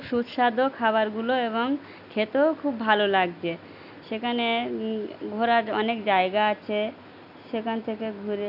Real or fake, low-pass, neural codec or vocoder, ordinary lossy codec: real; 5.4 kHz; none; none